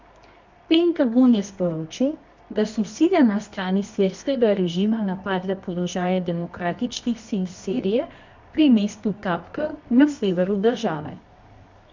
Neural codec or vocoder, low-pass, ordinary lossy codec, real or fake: codec, 24 kHz, 0.9 kbps, WavTokenizer, medium music audio release; 7.2 kHz; MP3, 64 kbps; fake